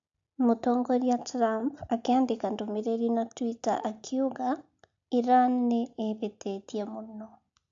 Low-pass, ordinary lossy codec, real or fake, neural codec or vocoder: 7.2 kHz; AAC, 64 kbps; real; none